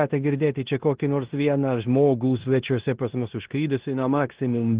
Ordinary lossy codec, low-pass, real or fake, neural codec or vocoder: Opus, 16 kbps; 3.6 kHz; fake; codec, 16 kHz in and 24 kHz out, 0.9 kbps, LongCat-Audio-Codec, four codebook decoder